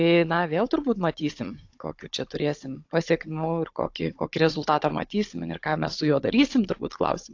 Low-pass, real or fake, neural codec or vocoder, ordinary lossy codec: 7.2 kHz; fake; codec, 16 kHz, 16 kbps, FunCodec, trained on Chinese and English, 50 frames a second; AAC, 48 kbps